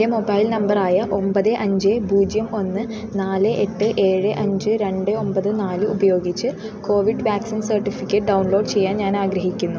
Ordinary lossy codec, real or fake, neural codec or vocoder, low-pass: none; real; none; none